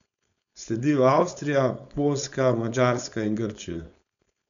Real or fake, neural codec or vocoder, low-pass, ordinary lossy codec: fake; codec, 16 kHz, 4.8 kbps, FACodec; 7.2 kHz; MP3, 96 kbps